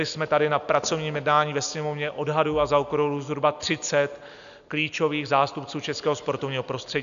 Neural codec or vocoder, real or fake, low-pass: none; real; 7.2 kHz